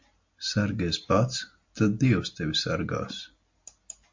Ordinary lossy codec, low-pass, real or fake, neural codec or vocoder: MP3, 48 kbps; 7.2 kHz; real; none